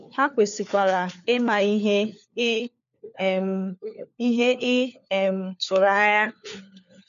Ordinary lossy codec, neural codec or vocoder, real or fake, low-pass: none; codec, 16 kHz, 4 kbps, FunCodec, trained on LibriTTS, 50 frames a second; fake; 7.2 kHz